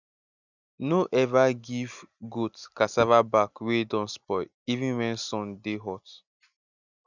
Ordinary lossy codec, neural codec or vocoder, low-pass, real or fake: none; none; 7.2 kHz; real